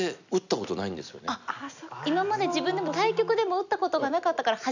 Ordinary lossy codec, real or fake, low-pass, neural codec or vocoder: none; real; 7.2 kHz; none